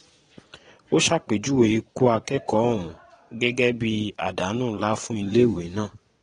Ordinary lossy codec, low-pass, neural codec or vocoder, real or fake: AAC, 32 kbps; 9.9 kHz; vocoder, 22.05 kHz, 80 mel bands, Vocos; fake